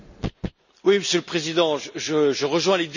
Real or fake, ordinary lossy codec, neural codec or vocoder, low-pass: real; none; none; 7.2 kHz